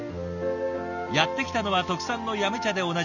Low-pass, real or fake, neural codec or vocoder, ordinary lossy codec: 7.2 kHz; real; none; none